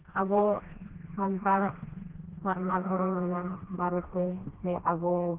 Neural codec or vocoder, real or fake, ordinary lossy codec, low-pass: codec, 16 kHz, 1 kbps, FreqCodec, smaller model; fake; Opus, 16 kbps; 3.6 kHz